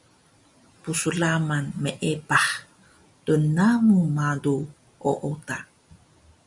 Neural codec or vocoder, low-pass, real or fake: none; 10.8 kHz; real